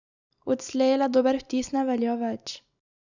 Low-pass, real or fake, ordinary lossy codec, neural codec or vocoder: 7.2 kHz; real; none; none